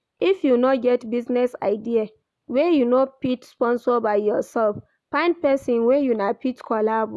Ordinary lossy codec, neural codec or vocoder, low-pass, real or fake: none; none; none; real